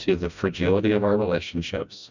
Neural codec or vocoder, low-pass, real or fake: codec, 16 kHz, 1 kbps, FreqCodec, smaller model; 7.2 kHz; fake